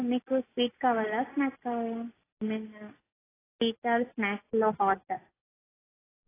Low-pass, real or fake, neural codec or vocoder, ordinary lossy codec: 3.6 kHz; real; none; AAC, 16 kbps